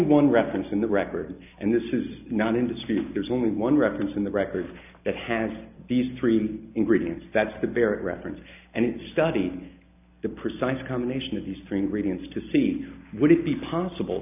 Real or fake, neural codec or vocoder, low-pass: real; none; 3.6 kHz